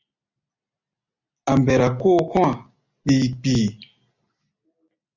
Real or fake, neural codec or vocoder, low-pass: real; none; 7.2 kHz